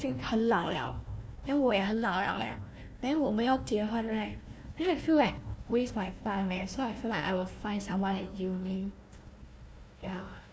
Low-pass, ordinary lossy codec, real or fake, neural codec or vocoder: none; none; fake; codec, 16 kHz, 1 kbps, FunCodec, trained on Chinese and English, 50 frames a second